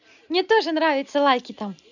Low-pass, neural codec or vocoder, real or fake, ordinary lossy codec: 7.2 kHz; none; real; none